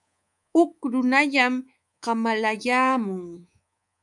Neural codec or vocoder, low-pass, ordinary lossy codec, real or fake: codec, 24 kHz, 3.1 kbps, DualCodec; 10.8 kHz; MP3, 96 kbps; fake